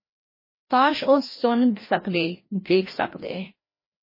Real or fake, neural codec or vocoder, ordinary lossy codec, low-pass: fake; codec, 16 kHz, 1 kbps, FreqCodec, larger model; MP3, 24 kbps; 5.4 kHz